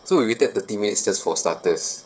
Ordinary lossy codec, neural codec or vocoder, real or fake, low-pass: none; codec, 16 kHz, 8 kbps, FreqCodec, smaller model; fake; none